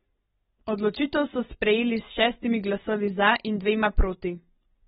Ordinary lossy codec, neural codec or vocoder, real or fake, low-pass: AAC, 16 kbps; none; real; 7.2 kHz